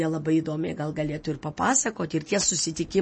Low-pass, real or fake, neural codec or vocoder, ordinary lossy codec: 10.8 kHz; real; none; MP3, 32 kbps